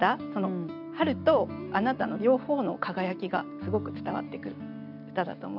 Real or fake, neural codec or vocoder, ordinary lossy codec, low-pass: real; none; none; 5.4 kHz